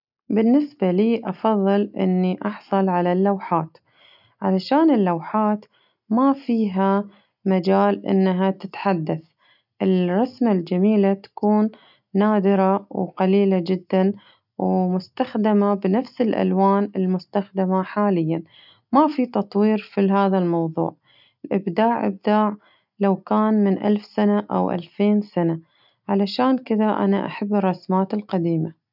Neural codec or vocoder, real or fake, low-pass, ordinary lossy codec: none; real; 5.4 kHz; none